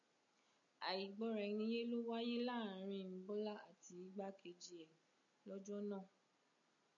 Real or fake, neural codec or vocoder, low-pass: real; none; 7.2 kHz